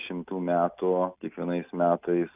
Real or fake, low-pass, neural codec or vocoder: real; 3.6 kHz; none